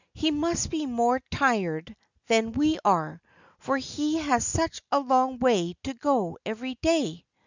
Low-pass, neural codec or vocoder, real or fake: 7.2 kHz; none; real